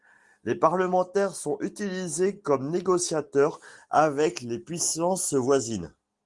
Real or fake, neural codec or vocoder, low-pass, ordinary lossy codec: real; none; 10.8 kHz; Opus, 24 kbps